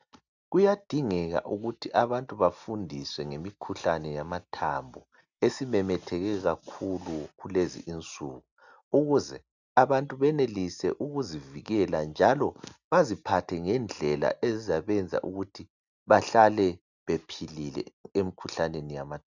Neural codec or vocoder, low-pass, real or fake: none; 7.2 kHz; real